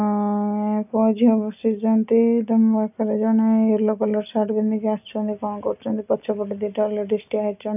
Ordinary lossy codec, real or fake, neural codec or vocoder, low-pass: none; real; none; 3.6 kHz